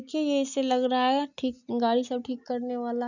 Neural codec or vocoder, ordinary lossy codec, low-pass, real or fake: none; none; 7.2 kHz; real